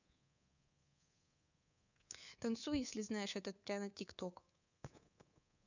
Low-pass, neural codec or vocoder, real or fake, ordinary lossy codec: 7.2 kHz; codec, 24 kHz, 3.1 kbps, DualCodec; fake; none